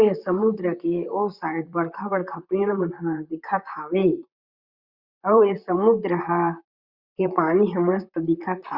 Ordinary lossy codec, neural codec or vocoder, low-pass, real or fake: Opus, 64 kbps; vocoder, 44.1 kHz, 128 mel bands, Pupu-Vocoder; 5.4 kHz; fake